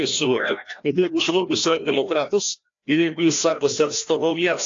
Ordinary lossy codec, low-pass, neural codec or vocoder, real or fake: AAC, 48 kbps; 7.2 kHz; codec, 16 kHz, 1 kbps, FreqCodec, larger model; fake